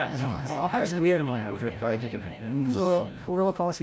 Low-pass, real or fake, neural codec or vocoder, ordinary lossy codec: none; fake; codec, 16 kHz, 0.5 kbps, FreqCodec, larger model; none